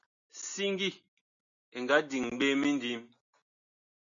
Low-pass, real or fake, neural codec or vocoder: 7.2 kHz; real; none